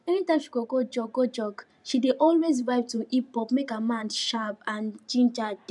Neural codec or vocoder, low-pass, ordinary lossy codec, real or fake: vocoder, 44.1 kHz, 128 mel bands every 512 samples, BigVGAN v2; 10.8 kHz; none; fake